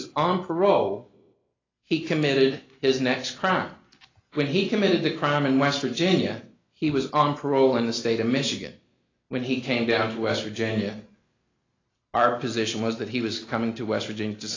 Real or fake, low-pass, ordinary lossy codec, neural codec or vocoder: real; 7.2 kHz; AAC, 32 kbps; none